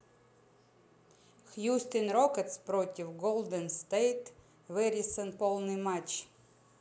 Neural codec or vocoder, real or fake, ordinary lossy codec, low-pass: none; real; none; none